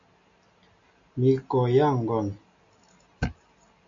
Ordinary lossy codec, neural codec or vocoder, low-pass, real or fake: AAC, 64 kbps; none; 7.2 kHz; real